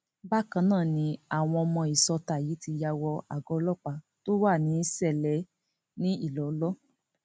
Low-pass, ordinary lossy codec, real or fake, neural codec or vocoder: none; none; real; none